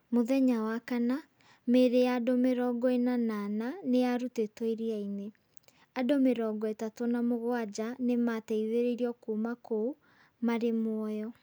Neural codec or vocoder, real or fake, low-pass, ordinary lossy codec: none; real; none; none